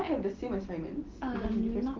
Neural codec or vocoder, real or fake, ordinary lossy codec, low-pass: none; real; Opus, 16 kbps; 7.2 kHz